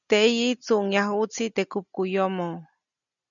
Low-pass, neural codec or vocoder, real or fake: 7.2 kHz; none; real